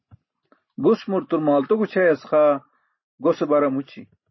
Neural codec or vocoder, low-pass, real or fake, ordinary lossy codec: none; 7.2 kHz; real; MP3, 24 kbps